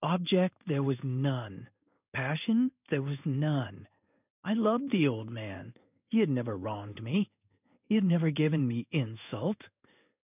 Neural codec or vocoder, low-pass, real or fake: codec, 16 kHz in and 24 kHz out, 1 kbps, XY-Tokenizer; 3.6 kHz; fake